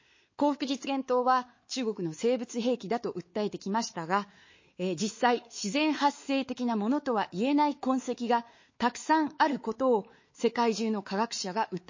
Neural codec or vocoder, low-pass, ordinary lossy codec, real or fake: codec, 16 kHz, 4 kbps, X-Codec, WavLM features, trained on Multilingual LibriSpeech; 7.2 kHz; MP3, 32 kbps; fake